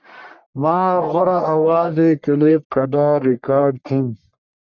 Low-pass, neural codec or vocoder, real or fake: 7.2 kHz; codec, 44.1 kHz, 1.7 kbps, Pupu-Codec; fake